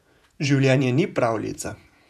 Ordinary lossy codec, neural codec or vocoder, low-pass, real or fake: none; vocoder, 48 kHz, 128 mel bands, Vocos; 14.4 kHz; fake